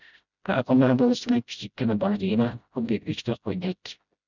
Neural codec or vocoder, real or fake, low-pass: codec, 16 kHz, 0.5 kbps, FreqCodec, smaller model; fake; 7.2 kHz